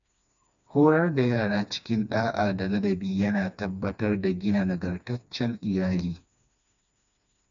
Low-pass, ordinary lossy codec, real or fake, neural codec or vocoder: 7.2 kHz; none; fake; codec, 16 kHz, 2 kbps, FreqCodec, smaller model